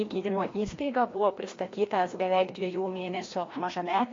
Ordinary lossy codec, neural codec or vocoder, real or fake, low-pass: AAC, 32 kbps; codec, 16 kHz, 1 kbps, FreqCodec, larger model; fake; 7.2 kHz